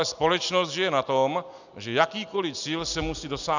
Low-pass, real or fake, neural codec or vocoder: 7.2 kHz; real; none